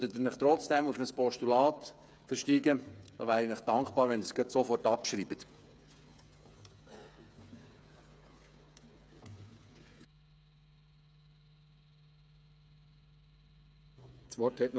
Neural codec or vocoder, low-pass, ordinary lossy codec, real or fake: codec, 16 kHz, 8 kbps, FreqCodec, smaller model; none; none; fake